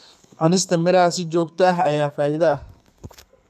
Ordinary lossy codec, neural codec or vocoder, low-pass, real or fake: none; codec, 32 kHz, 1.9 kbps, SNAC; 14.4 kHz; fake